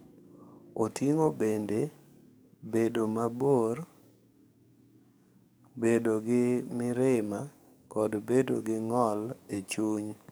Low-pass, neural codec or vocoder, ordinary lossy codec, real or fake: none; codec, 44.1 kHz, 7.8 kbps, DAC; none; fake